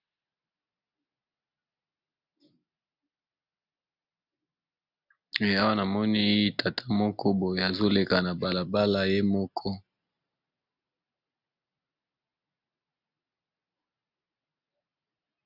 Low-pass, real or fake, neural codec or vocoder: 5.4 kHz; real; none